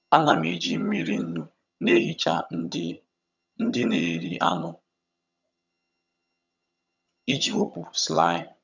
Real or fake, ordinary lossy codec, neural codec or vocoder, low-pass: fake; none; vocoder, 22.05 kHz, 80 mel bands, HiFi-GAN; 7.2 kHz